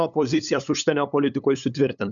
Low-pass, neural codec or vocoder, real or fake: 7.2 kHz; codec, 16 kHz, 8 kbps, FunCodec, trained on LibriTTS, 25 frames a second; fake